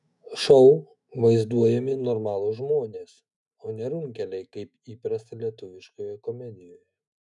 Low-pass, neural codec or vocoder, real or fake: 10.8 kHz; autoencoder, 48 kHz, 128 numbers a frame, DAC-VAE, trained on Japanese speech; fake